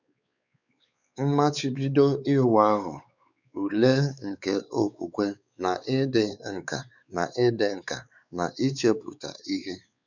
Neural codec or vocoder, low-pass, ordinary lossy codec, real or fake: codec, 16 kHz, 4 kbps, X-Codec, WavLM features, trained on Multilingual LibriSpeech; 7.2 kHz; none; fake